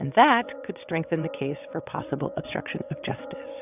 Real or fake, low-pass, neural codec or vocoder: fake; 3.6 kHz; vocoder, 22.05 kHz, 80 mel bands, WaveNeXt